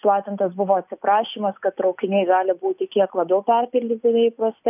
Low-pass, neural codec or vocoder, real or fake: 3.6 kHz; none; real